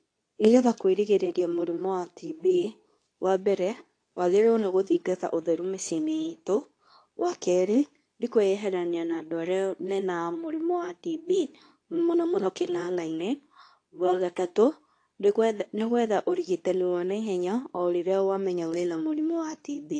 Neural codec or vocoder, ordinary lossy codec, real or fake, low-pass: codec, 24 kHz, 0.9 kbps, WavTokenizer, medium speech release version 2; AAC, 48 kbps; fake; 9.9 kHz